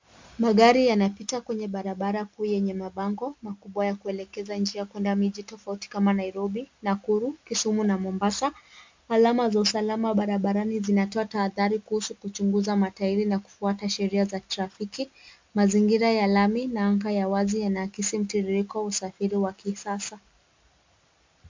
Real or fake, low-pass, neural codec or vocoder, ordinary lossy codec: real; 7.2 kHz; none; MP3, 64 kbps